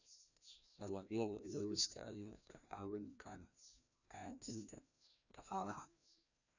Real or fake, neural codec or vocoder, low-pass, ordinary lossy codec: fake; codec, 16 kHz, 1 kbps, FreqCodec, larger model; 7.2 kHz; none